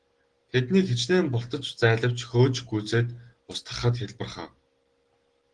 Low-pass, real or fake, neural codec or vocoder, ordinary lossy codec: 9.9 kHz; real; none; Opus, 16 kbps